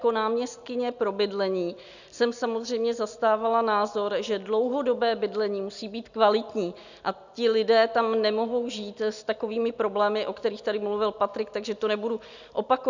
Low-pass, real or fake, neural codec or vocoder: 7.2 kHz; real; none